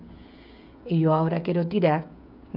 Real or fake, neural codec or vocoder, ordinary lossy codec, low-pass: fake; codec, 16 kHz, 16 kbps, FreqCodec, smaller model; none; 5.4 kHz